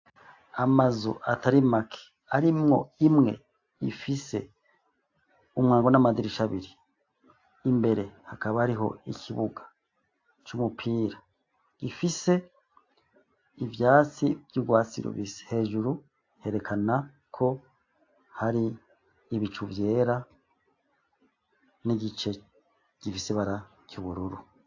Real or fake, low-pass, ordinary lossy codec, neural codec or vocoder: real; 7.2 kHz; AAC, 48 kbps; none